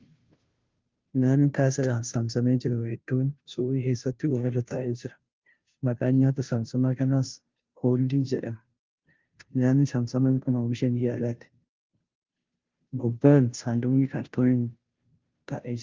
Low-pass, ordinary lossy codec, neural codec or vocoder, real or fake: 7.2 kHz; Opus, 32 kbps; codec, 16 kHz, 0.5 kbps, FunCodec, trained on Chinese and English, 25 frames a second; fake